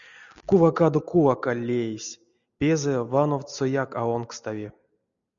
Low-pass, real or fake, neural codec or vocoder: 7.2 kHz; real; none